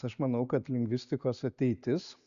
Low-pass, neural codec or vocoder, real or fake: 7.2 kHz; none; real